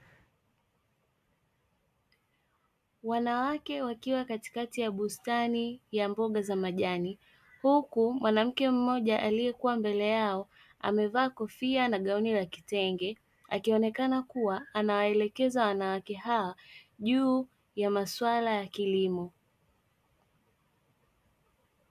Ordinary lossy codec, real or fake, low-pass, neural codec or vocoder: AAC, 96 kbps; real; 14.4 kHz; none